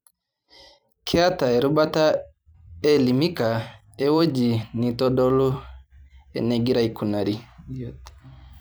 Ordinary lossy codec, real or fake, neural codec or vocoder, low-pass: none; fake; vocoder, 44.1 kHz, 128 mel bands every 256 samples, BigVGAN v2; none